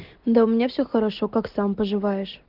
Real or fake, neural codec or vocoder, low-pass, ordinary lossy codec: real; none; 5.4 kHz; Opus, 16 kbps